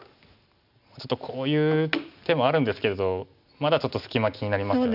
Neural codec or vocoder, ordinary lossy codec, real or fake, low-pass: none; none; real; 5.4 kHz